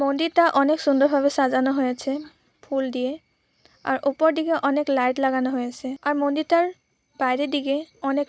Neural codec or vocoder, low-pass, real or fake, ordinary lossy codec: none; none; real; none